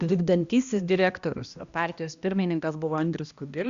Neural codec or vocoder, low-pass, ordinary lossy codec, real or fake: codec, 16 kHz, 1 kbps, X-Codec, HuBERT features, trained on balanced general audio; 7.2 kHz; Opus, 64 kbps; fake